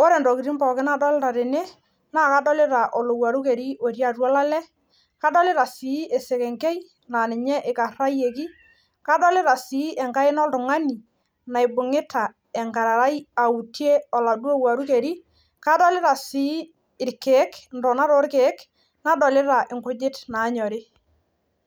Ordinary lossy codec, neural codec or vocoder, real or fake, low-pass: none; none; real; none